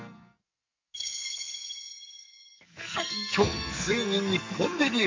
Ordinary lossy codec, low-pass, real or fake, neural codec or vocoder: MP3, 48 kbps; 7.2 kHz; fake; codec, 44.1 kHz, 2.6 kbps, SNAC